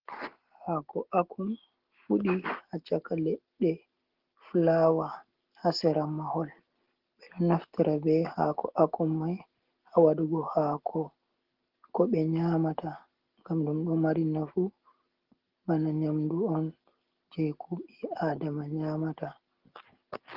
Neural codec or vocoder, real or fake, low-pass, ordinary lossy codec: none; real; 5.4 kHz; Opus, 16 kbps